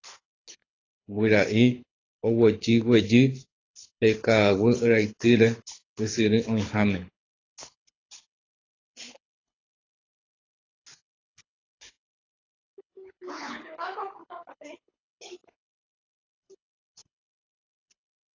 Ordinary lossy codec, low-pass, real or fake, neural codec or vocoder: AAC, 32 kbps; 7.2 kHz; fake; codec, 24 kHz, 6 kbps, HILCodec